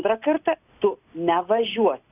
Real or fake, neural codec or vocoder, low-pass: real; none; 3.6 kHz